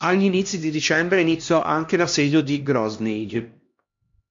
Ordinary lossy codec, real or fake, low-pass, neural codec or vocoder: MP3, 48 kbps; fake; 7.2 kHz; codec, 16 kHz, 1 kbps, X-Codec, HuBERT features, trained on LibriSpeech